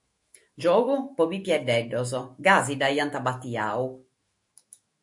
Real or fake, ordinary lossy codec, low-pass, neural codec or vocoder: fake; MP3, 48 kbps; 10.8 kHz; autoencoder, 48 kHz, 128 numbers a frame, DAC-VAE, trained on Japanese speech